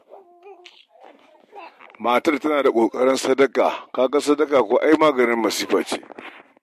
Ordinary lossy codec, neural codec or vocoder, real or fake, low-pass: MP3, 64 kbps; none; real; 14.4 kHz